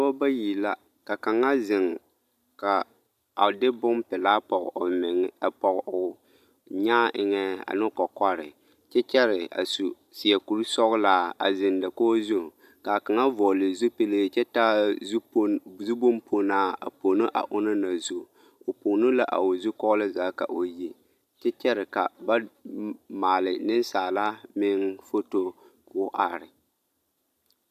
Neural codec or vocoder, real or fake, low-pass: none; real; 14.4 kHz